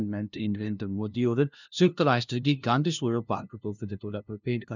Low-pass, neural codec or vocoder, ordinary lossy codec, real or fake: 7.2 kHz; codec, 16 kHz, 0.5 kbps, FunCodec, trained on LibriTTS, 25 frames a second; none; fake